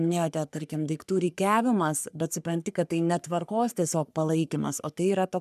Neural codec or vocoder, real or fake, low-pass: codec, 44.1 kHz, 3.4 kbps, Pupu-Codec; fake; 14.4 kHz